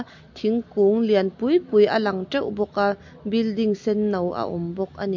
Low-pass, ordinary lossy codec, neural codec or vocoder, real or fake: 7.2 kHz; MP3, 48 kbps; none; real